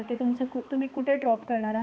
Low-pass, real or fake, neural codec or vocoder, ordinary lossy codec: none; fake; codec, 16 kHz, 2 kbps, X-Codec, HuBERT features, trained on balanced general audio; none